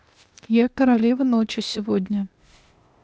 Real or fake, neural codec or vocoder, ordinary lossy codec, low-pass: fake; codec, 16 kHz, 0.8 kbps, ZipCodec; none; none